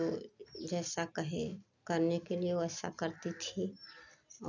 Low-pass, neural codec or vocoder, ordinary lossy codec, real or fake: 7.2 kHz; none; none; real